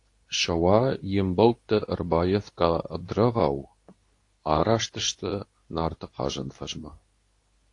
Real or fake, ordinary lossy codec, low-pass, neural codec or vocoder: fake; AAC, 48 kbps; 10.8 kHz; codec, 24 kHz, 0.9 kbps, WavTokenizer, medium speech release version 2